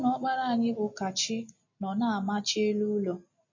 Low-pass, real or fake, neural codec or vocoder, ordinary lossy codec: 7.2 kHz; real; none; MP3, 32 kbps